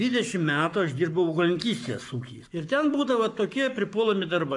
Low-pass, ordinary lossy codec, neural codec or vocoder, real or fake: 10.8 kHz; AAC, 64 kbps; codec, 44.1 kHz, 7.8 kbps, Pupu-Codec; fake